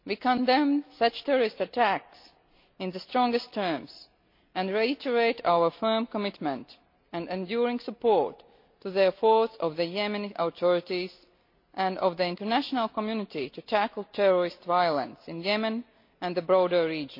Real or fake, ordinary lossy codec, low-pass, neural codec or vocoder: real; MP3, 32 kbps; 5.4 kHz; none